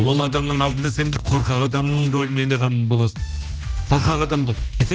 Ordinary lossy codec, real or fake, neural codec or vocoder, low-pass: none; fake; codec, 16 kHz, 1 kbps, X-Codec, HuBERT features, trained on general audio; none